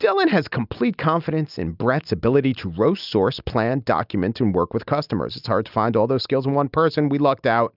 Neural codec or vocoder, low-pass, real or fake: none; 5.4 kHz; real